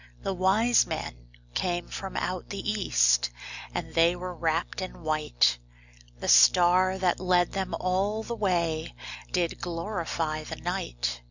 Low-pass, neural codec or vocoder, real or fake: 7.2 kHz; none; real